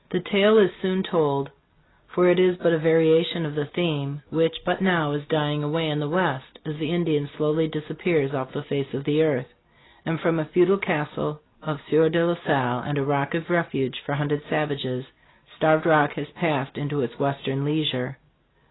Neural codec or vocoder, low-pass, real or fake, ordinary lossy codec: none; 7.2 kHz; real; AAC, 16 kbps